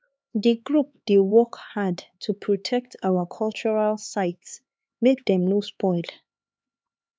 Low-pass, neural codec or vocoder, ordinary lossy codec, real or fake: none; codec, 16 kHz, 4 kbps, X-Codec, HuBERT features, trained on LibriSpeech; none; fake